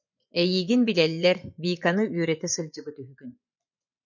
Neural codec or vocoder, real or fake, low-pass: vocoder, 24 kHz, 100 mel bands, Vocos; fake; 7.2 kHz